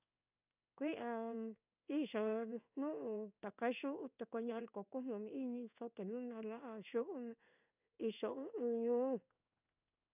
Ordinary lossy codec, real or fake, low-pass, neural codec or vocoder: none; fake; 3.6 kHz; codec, 16 kHz in and 24 kHz out, 1 kbps, XY-Tokenizer